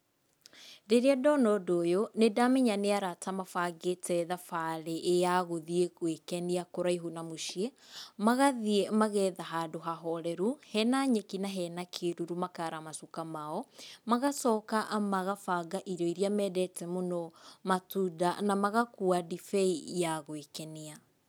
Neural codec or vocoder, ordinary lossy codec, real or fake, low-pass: none; none; real; none